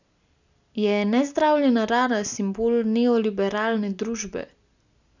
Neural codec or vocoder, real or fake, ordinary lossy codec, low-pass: none; real; none; 7.2 kHz